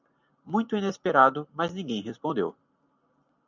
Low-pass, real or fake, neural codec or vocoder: 7.2 kHz; real; none